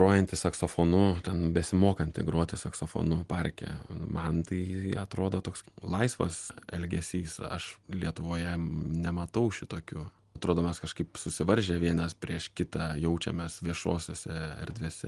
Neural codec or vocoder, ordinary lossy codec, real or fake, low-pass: none; Opus, 24 kbps; real; 10.8 kHz